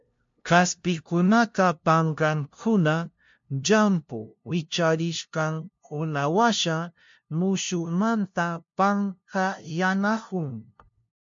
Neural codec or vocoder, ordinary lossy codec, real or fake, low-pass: codec, 16 kHz, 0.5 kbps, FunCodec, trained on LibriTTS, 25 frames a second; MP3, 48 kbps; fake; 7.2 kHz